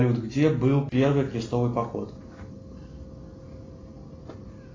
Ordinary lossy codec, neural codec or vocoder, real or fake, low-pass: AAC, 32 kbps; none; real; 7.2 kHz